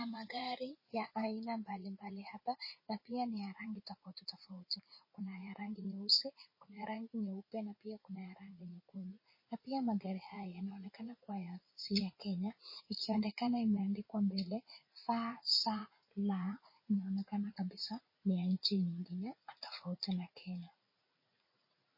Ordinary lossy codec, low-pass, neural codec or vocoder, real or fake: MP3, 24 kbps; 5.4 kHz; vocoder, 44.1 kHz, 80 mel bands, Vocos; fake